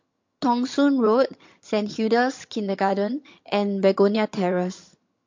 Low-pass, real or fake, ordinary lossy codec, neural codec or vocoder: 7.2 kHz; fake; MP3, 48 kbps; vocoder, 22.05 kHz, 80 mel bands, HiFi-GAN